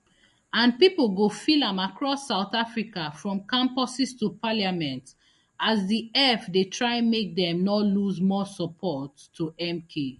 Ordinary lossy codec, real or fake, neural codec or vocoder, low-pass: MP3, 48 kbps; real; none; 14.4 kHz